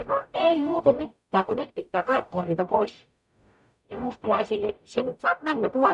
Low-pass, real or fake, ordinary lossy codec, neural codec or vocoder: 10.8 kHz; fake; none; codec, 44.1 kHz, 0.9 kbps, DAC